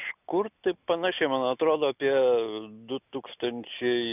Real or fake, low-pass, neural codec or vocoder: real; 3.6 kHz; none